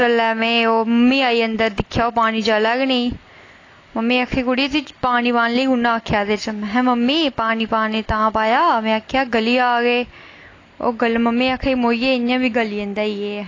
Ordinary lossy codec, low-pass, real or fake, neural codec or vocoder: AAC, 32 kbps; 7.2 kHz; real; none